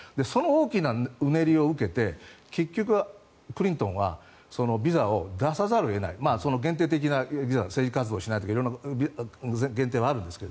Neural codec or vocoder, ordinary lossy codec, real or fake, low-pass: none; none; real; none